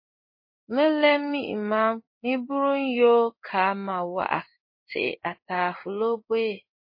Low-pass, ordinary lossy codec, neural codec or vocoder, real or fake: 5.4 kHz; MP3, 24 kbps; codec, 16 kHz in and 24 kHz out, 1 kbps, XY-Tokenizer; fake